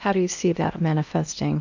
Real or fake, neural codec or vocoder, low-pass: fake; codec, 16 kHz in and 24 kHz out, 0.8 kbps, FocalCodec, streaming, 65536 codes; 7.2 kHz